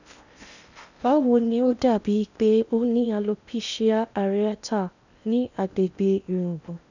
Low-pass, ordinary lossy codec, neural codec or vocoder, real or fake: 7.2 kHz; none; codec, 16 kHz in and 24 kHz out, 0.8 kbps, FocalCodec, streaming, 65536 codes; fake